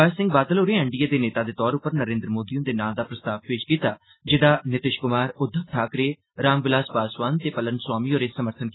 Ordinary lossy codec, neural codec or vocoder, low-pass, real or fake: AAC, 16 kbps; none; 7.2 kHz; real